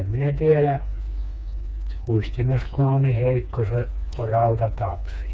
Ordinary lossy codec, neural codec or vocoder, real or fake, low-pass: none; codec, 16 kHz, 2 kbps, FreqCodec, smaller model; fake; none